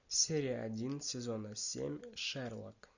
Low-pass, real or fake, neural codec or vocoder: 7.2 kHz; real; none